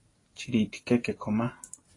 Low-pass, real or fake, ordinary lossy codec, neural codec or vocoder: 10.8 kHz; real; AAC, 32 kbps; none